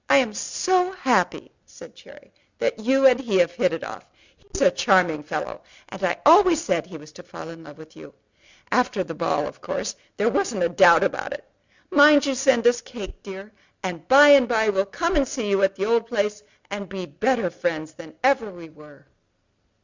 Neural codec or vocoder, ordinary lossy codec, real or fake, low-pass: none; Opus, 64 kbps; real; 7.2 kHz